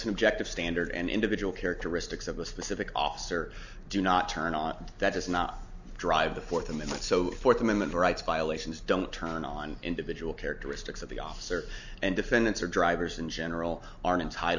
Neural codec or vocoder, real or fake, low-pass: none; real; 7.2 kHz